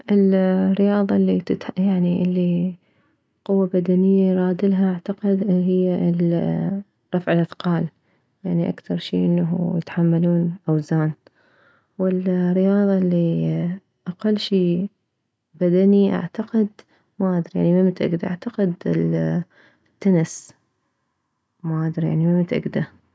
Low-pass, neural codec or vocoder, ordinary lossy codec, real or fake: none; none; none; real